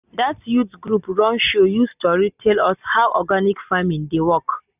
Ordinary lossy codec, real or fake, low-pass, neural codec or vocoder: none; real; 3.6 kHz; none